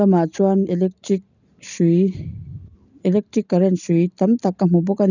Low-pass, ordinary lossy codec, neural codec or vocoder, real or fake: 7.2 kHz; none; none; real